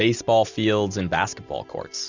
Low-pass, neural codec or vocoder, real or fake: 7.2 kHz; none; real